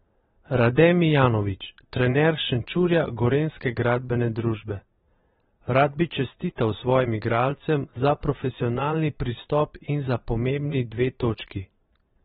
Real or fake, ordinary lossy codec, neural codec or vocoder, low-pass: fake; AAC, 16 kbps; vocoder, 22.05 kHz, 80 mel bands, Vocos; 9.9 kHz